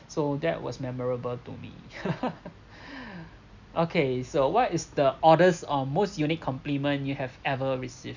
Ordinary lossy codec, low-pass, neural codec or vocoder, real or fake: none; 7.2 kHz; none; real